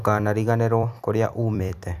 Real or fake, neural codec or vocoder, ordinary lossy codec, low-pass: fake; vocoder, 44.1 kHz, 128 mel bands every 256 samples, BigVGAN v2; none; 14.4 kHz